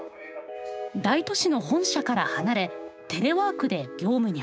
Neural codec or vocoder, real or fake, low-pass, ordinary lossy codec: codec, 16 kHz, 6 kbps, DAC; fake; none; none